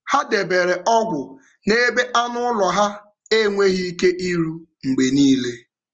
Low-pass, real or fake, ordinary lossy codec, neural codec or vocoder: 7.2 kHz; real; Opus, 24 kbps; none